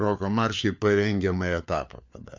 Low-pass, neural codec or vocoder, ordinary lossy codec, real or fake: 7.2 kHz; codec, 16 kHz, 4 kbps, FunCodec, trained on LibriTTS, 50 frames a second; MP3, 64 kbps; fake